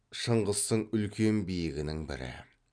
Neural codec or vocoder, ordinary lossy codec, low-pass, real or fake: none; none; 9.9 kHz; real